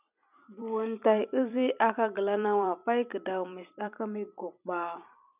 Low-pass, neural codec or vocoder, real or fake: 3.6 kHz; vocoder, 44.1 kHz, 128 mel bands every 256 samples, BigVGAN v2; fake